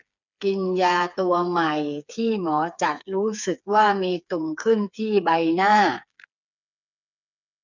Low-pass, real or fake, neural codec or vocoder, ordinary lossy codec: 7.2 kHz; fake; codec, 16 kHz, 4 kbps, FreqCodec, smaller model; none